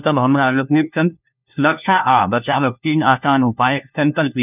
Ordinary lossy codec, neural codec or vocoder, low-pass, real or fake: none; codec, 16 kHz, 1 kbps, FunCodec, trained on LibriTTS, 50 frames a second; 3.6 kHz; fake